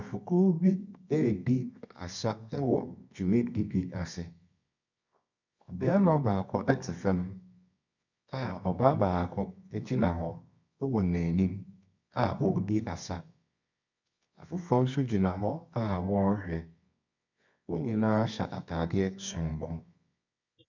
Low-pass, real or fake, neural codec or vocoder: 7.2 kHz; fake; codec, 24 kHz, 0.9 kbps, WavTokenizer, medium music audio release